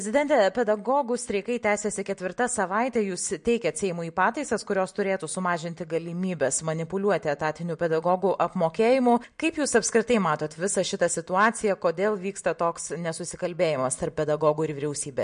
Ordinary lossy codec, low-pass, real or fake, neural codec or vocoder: MP3, 48 kbps; 9.9 kHz; real; none